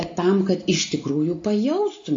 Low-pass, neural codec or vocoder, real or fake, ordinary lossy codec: 7.2 kHz; none; real; AAC, 48 kbps